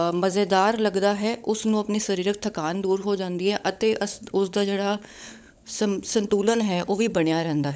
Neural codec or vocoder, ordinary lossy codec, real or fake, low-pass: codec, 16 kHz, 16 kbps, FunCodec, trained on LibriTTS, 50 frames a second; none; fake; none